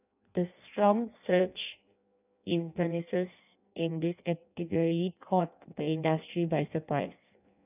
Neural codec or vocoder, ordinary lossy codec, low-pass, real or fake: codec, 16 kHz in and 24 kHz out, 0.6 kbps, FireRedTTS-2 codec; none; 3.6 kHz; fake